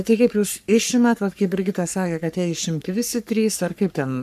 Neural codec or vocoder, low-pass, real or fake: codec, 44.1 kHz, 3.4 kbps, Pupu-Codec; 14.4 kHz; fake